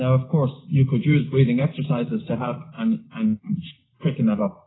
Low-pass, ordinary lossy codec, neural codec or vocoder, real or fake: 7.2 kHz; AAC, 16 kbps; none; real